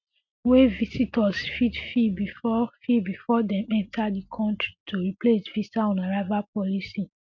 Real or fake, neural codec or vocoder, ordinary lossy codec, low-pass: real; none; MP3, 64 kbps; 7.2 kHz